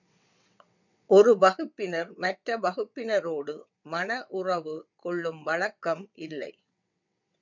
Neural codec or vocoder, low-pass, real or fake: vocoder, 22.05 kHz, 80 mel bands, WaveNeXt; 7.2 kHz; fake